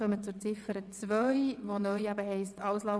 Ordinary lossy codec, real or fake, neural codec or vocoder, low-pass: none; fake; vocoder, 22.05 kHz, 80 mel bands, WaveNeXt; none